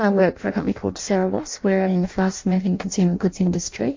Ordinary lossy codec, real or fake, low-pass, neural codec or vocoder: MP3, 48 kbps; fake; 7.2 kHz; codec, 16 kHz in and 24 kHz out, 0.6 kbps, FireRedTTS-2 codec